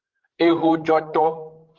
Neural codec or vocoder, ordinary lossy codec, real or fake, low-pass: codec, 16 kHz, 16 kbps, FreqCodec, larger model; Opus, 16 kbps; fake; 7.2 kHz